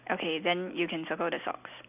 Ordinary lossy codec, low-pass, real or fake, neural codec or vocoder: none; 3.6 kHz; real; none